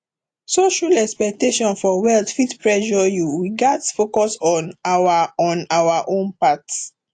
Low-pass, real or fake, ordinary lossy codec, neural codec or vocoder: 9.9 kHz; real; AAC, 48 kbps; none